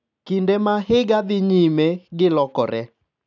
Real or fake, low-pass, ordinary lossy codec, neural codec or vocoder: real; 7.2 kHz; none; none